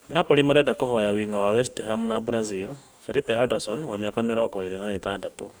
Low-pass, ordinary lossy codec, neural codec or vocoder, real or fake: none; none; codec, 44.1 kHz, 2.6 kbps, DAC; fake